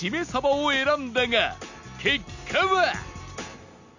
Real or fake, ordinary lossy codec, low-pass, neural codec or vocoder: real; none; 7.2 kHz; none